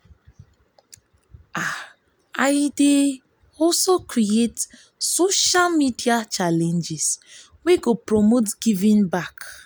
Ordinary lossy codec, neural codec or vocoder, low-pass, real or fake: none; none; none; real